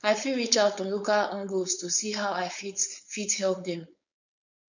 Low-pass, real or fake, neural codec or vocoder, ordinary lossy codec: 7.2 kHz; fake; codec, 16 kHz, 4.8 kbps, FACodec; none